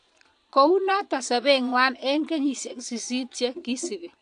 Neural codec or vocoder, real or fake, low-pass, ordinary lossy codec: vocoder, 22.05 kHz, 80 mel bands, Vocos; fake; 9.9 kHz; AAC, 64 kbps